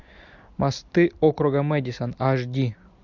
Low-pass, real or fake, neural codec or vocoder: 7.2 kHz; real; none